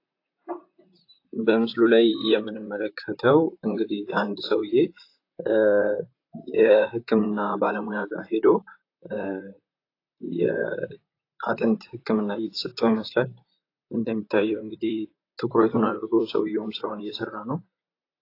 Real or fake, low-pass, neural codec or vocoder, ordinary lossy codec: fake; 5.4 kHz; vocoder, 44.1 kHz, 128 mel bands, Pupu-Vocoder; AAC, 32 kbps